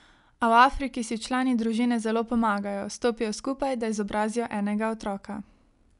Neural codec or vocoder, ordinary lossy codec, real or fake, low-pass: none; none; real; 10.8 kHz